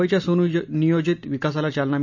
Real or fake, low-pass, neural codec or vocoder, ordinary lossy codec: real; 7.2 kHz; none; none